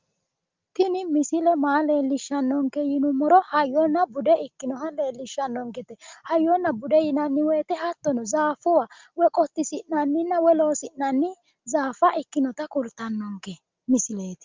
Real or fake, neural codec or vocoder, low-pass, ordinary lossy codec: real; none; 7.2 kHz; Opus, 24 kbps